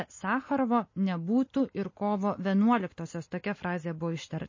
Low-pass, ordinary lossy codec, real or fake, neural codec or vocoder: 7.2 kHz; MP3, 32 kbps; real; none